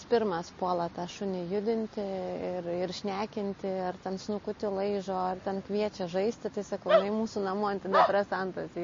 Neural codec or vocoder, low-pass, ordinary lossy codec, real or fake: none; 7.2 kHz; MP3, 32 kbps; real